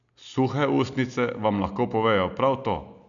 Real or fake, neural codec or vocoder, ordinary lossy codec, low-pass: real; none; none; 7.2 kHz